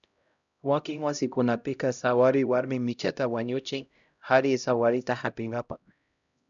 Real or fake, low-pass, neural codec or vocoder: fake; 7.2 kHz; codec, 16 kHz, 0.5 kbps, X-Codec, HuBERT features, trained on LibriSpeech